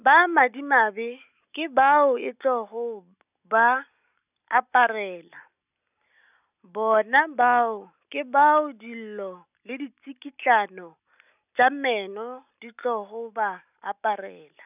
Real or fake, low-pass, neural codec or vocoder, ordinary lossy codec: real; 3.6 kHz; none; none